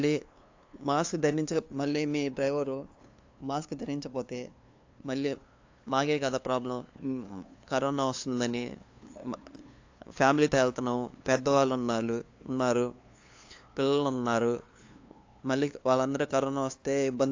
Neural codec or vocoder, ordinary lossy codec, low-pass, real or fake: codec, 16 kHz, 2 kbps, FunCodec, trained on LibriTTS, 25 frames a second; AAC, 48 kbps; 7.2 kHz; fake